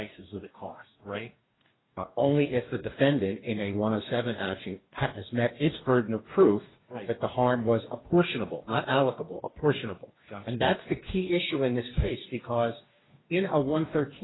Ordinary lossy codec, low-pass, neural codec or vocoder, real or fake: AAC, 16 kbps; 7.2 kHz; codec, 44.1 kHz, 2.6 kbps, DAC; fake